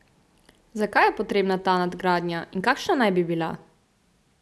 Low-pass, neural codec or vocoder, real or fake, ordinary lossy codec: none; none; real; none